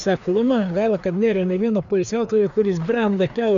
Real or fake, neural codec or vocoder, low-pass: fake; codec, 16 kHz, 2 kbps, FreqCodec, larger model; 7.2 kHz